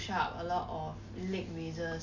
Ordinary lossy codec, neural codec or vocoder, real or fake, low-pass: none; none; real; 7.2 kHz